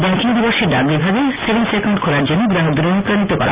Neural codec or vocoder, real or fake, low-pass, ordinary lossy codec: none; real; 3.6 kHz; Opus, 64 kbps